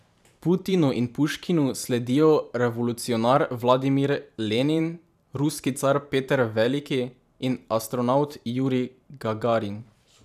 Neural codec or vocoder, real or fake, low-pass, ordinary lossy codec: vocoder, 44.1 kHz, 128 mel bands every 512 samples, BigVGAN v2; fake; 14.4 kHz; none